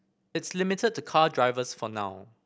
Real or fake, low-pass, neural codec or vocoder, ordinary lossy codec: real; none; none; none